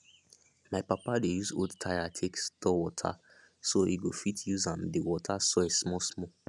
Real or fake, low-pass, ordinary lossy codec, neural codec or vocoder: real; none; none; none